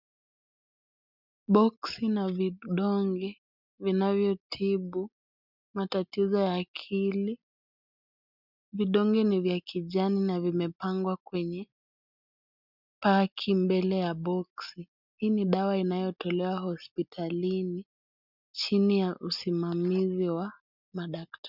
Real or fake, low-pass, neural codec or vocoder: real; 5.4 kHz; none